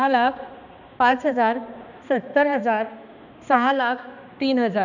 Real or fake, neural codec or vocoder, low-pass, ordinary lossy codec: fake; codec, 16 kHz, 2 kbps, X-Codec, HuBERT features, trained on balanced general audio; 7.2 kHz; none